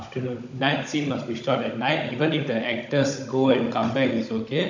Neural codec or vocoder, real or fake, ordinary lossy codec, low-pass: codec, 16 kHz, 16 kbps, FunCodec, trained on Chinese and English, 50 frames a second; fake; MP3, 64 kbps; 7.2 kHz